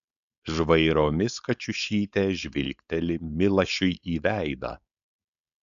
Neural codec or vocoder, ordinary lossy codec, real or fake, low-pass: codec, 16 kHz, 4.8 kbps, FACodec; AAC, 96 kbps; fake; 7.2 kHz